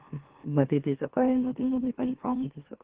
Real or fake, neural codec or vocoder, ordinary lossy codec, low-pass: fake; autoencoder, 44.1 kHz, a latent of 192 numbers a frame, MeloTTS; Opus, 16 kbps; 3.6 kHz